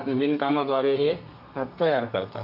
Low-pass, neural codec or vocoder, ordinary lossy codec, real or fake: 5.4 kHz; codec, 32 kHz, 1.9 kbps, SNAC; none; fake